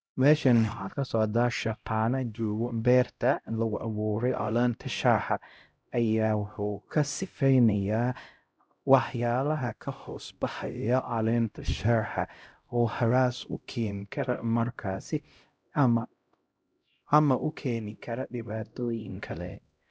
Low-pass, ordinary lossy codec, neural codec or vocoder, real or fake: none; none; codec, 16 kHz, 0.5 kbps, X-Codec, HuBERT features, trained on LibriSpeech; fake